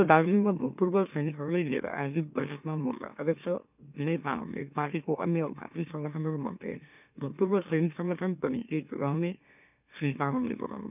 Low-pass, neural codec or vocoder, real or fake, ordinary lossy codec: 3.6 kHz; autoencoder, 44.1 kHz, a latent of 192 numbers a frame, MeloTTS; fake; none